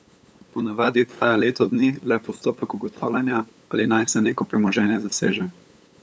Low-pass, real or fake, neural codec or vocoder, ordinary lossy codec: none; fake; codec, 16 kHz, 8 kbps, FunCodec, trained on LibriTTS, 25 frames a second; none